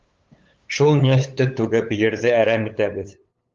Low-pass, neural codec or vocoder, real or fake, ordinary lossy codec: 7.2 kHz; codec, 16 kHz, 8 kbps, FunCodec, trained on LibriTTS, 25 frames a second; fake; Opus, 32 kbps